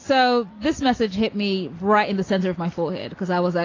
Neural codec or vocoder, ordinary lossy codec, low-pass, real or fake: none; AAC, 32 kbps; 7.2 kHz; real